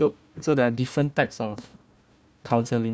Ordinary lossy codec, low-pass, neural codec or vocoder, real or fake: none; none; codec, 16 kHz, 1 kbps, FunCodec, trained on Chinese and English, 50 frames a second; fake